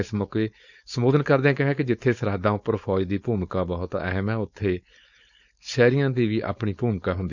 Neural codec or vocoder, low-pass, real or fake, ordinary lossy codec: codec, 16 kHz, 4.8 kbps, FACodec; 7.2 kHz; fake; none